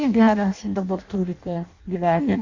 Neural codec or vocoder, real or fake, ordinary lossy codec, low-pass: codec, 16 kHz in and 24 kHz out, 0.6 kbps, FireRedTTS-2 codec; fake; Opus, 64 kbps; 7.2 kHz